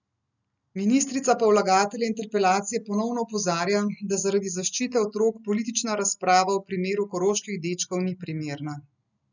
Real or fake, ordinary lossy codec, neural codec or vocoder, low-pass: real; none; none; 7.2 kHz